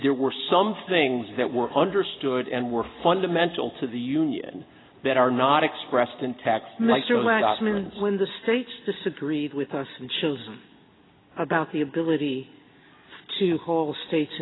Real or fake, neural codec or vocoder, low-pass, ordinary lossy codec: real; none; 7.2 kHz; AAC, 16 kbps